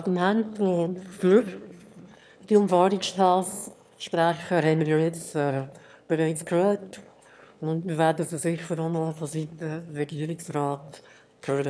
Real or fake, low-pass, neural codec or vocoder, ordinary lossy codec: fake; none; autoencoder, 22.05 kHz, a latent of 192 numbers a frame, VITS, trained on one speaker; none